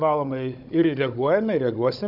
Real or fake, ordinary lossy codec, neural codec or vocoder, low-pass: fake; AAC, 48 kbps; codec, 16 kHz, 16 kbps, FunCodec, trained on Chinese and English, 50 frames a second; 5.4 kHz